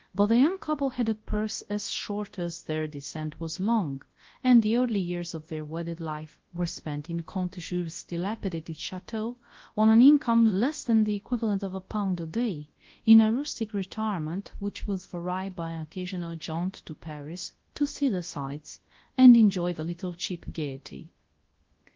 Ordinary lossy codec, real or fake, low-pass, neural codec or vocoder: Opus, 16 kbps; fake; 7.2 kHz; codec, 24 kHz, 0.9 kbps, WavTokenizer, large speech release